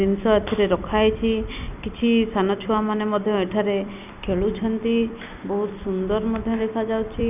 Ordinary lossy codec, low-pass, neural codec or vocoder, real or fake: none; 3.6 kHz; vocoder, 44.1 kHz, 128 mel bands every 256 samples, BigVGAN v2; fake